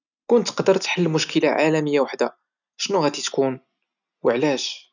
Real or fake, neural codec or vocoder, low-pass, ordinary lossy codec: real; none; 7.2 kHz; none